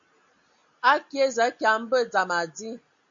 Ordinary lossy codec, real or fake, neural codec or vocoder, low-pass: MP3, 64 kbps; real; none; 7.2 kHz